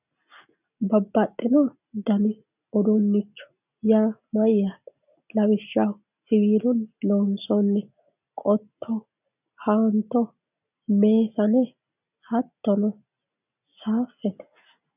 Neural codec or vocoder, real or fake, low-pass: none; real; 3.6 kHz